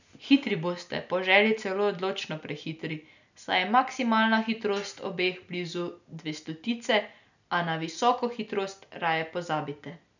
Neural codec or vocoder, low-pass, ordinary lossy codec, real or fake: none; 7.2 kHz; none; real